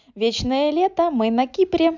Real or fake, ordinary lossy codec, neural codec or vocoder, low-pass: real; none; none; 7.2 kHz